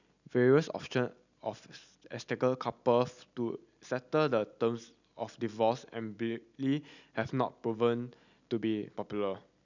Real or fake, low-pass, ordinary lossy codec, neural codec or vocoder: real; 7.2 kHz; none; none